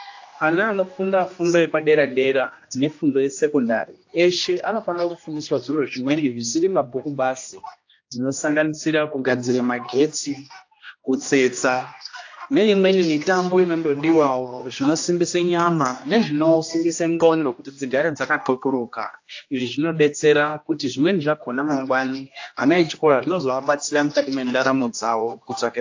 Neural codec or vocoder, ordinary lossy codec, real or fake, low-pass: codec, 16 kHz, 1 kbps, X-Codec, HuBERT features, trained on general audio; AAC, 48 kbps; fake; 7.2 kHz